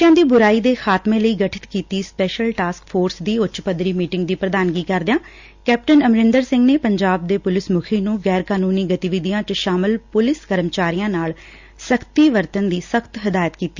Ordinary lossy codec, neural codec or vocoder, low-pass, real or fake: Opus, 64 kbps; none; 7.2 kHz; real